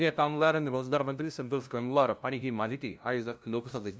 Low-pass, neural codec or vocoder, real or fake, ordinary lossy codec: none; codec, 16 kHz, 0.5 kbps, FunCodec, trained on LibriTTS, 25 frames a second; fake; none